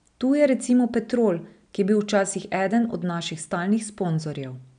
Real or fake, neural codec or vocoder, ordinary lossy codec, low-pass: real; none; none; 9.9 kHz